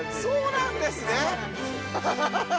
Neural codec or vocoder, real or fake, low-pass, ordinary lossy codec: none; real; none; none